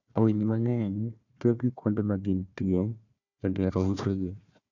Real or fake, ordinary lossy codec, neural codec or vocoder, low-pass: fake; none; codec, 16 kHz, 1 kbps, FunCodec, trained on Chinese and English, 50 frames a second; 7.2 kHz